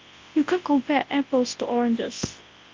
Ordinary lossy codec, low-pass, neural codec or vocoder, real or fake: Opus, 32 kbps; 7.2 kHz; codec, 24 kHz, 0.9 kbps, WavTokenizer, large speech release; fake